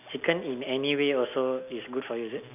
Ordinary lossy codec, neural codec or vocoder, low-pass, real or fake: none; none; 3.6 kHz; real